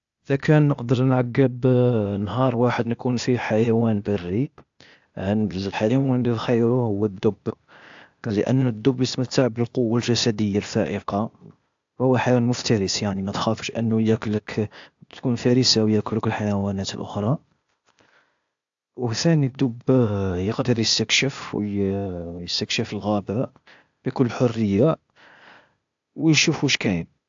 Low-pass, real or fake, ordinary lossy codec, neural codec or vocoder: 7.2 kHz; fake; AAC, 64 kbps; codec, 16 kHz, 0.8 kbps, ZipCodec